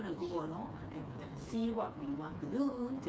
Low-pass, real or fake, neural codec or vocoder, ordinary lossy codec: none; fake; codec, 16 kHz, 4 kbps, FreqCodec, smaller model; none